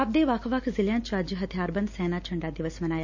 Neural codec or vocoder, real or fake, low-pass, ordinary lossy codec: none; real; 7.2 kHz; MP3, 48 kbps